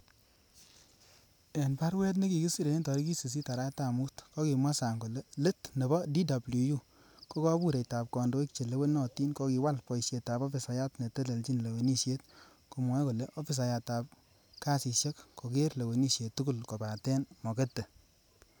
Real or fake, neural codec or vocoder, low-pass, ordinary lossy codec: real; none; none; none